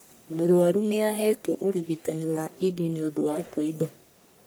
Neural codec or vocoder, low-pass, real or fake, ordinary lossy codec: codec, 44.1 kHz, 1.7 kbps, Pupu-Codec; none; fake; none